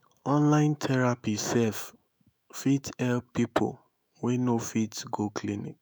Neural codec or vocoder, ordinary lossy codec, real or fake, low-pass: autoencoder, 48 kHz, 128 numbers a frame, DAC-VAE, trained on Japanese speech; none; fake; none